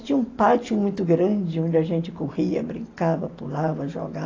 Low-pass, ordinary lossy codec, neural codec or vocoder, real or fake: 7.2 kHz; AAC, 32 kbps; none; real